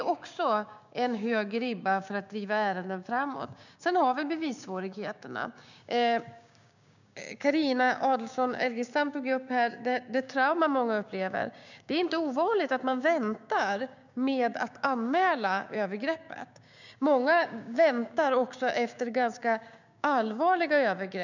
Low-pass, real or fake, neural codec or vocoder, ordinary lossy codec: 7.2 kHz; fake; codec, 16 kHz, 6 kbps, DAC; none